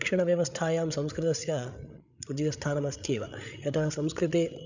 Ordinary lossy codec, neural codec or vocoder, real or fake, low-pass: none; codec, 16 kHz, 8 kbps, FreqCodec, larger model; fake; 7.2 kHz